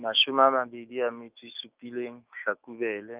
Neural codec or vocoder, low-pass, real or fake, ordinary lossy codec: autoencoder, 48 kHz, 128 numbers a frame, DAC-VAE, trained on Japanese speech; 3.6 kHz; fake; Opus, 24 kbps